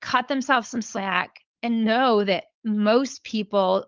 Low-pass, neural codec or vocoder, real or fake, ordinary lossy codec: 7.2 kHz; codec, 16 kHz, 4.8 kbps, FACodec; fake; Opus, 24 kbps